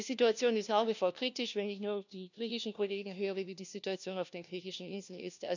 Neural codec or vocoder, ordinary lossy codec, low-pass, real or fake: codec, 16 kHz, 1 kbps, FunCodec, trained on LibriTTS, 50 frames a second; none; 7.2 kHz; fake